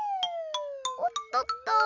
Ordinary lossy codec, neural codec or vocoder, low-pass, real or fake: none; none; 7.2 kHz; real